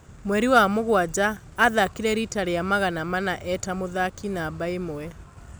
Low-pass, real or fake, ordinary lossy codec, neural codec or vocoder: none; real; none; none